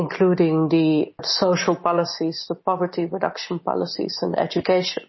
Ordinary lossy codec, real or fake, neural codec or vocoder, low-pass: MP3, 24 kbps; real; none; 7.2 kHz